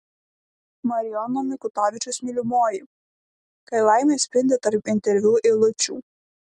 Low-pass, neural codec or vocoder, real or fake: 10.8 kHz; none; real